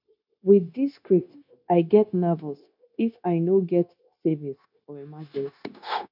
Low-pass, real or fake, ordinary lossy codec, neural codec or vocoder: 5.4 kHz; fake; none; codec, 16 kHz, 0.9 kbps, LongCat-Audio-Codec